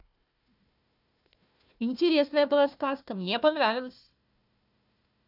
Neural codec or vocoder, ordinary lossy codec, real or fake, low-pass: codec, 16 kHz, 1 kbps, FunCodec, trained on Chinese and English, 50 frames a second; none; fake; 5.4 kHz